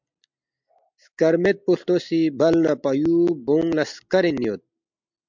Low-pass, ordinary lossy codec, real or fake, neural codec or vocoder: 7.2 kHz; MP3, 64 kbps; real; none